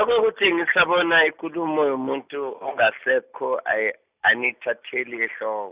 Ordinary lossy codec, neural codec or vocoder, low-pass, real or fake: Opus, 64 kbps; none; 3.6 kHz; real